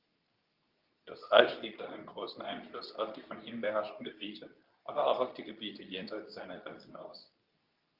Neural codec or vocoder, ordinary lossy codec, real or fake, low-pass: codec, 24 kHz, 0.9 kbps, WavTokenizer, medium speech release version 2; Opus, 24 kbps; fake; 5.4 kHz